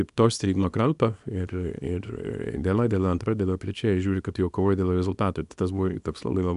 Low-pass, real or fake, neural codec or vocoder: 10.8 kHz; fake; codec, 24 kHz, 0.9 kbps, WavTokenizer, small release